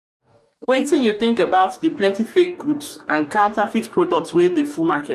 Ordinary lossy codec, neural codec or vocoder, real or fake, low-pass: none; codec, 44.1 kHz, 2.6 kbps, DAC; fake; 14.4 kHz